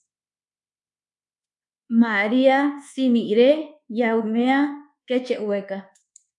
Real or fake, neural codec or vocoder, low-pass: fake; codec, 24 kHz, 1.2 kbps, DualCodec; 10.8 kHz